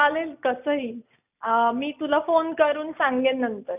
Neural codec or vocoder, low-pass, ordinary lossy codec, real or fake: none; 3.6 kHz; none; real